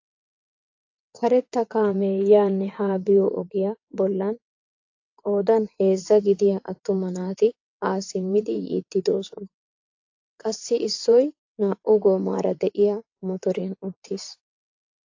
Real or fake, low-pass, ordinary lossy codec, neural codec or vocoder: fake; 7.2 kHz; AAC, 48 kbps; vocoder, 22.05 kHz, 80 mel bands, WaveNeXt